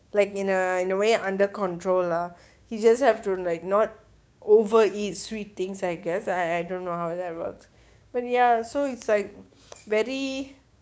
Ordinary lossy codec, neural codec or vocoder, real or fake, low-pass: none; codec, 16 kHz, 6 kbps, DAC; fake; none